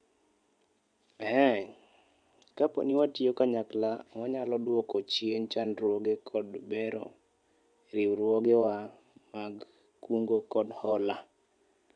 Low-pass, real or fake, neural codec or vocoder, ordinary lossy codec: 9.9 kHz; fake; vocoder, 24 kHz, 100 mel bands, Vocos; none